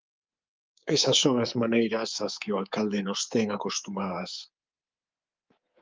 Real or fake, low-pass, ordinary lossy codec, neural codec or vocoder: fake; 7.2 kHz; Opus, 24 kbps; codec, 24 kHz, 3.1 kbps, DualCodec